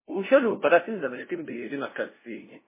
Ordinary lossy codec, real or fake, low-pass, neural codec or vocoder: MP3, 16 kbps; fake; 3.6 kHz; codec, 16 kHz, 0.5 kbps, FunCodec, trained on LibriTTS, 25 frames a second